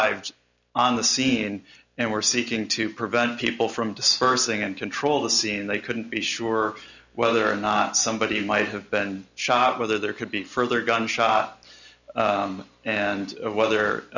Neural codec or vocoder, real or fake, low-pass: none; real; 7.2 kHz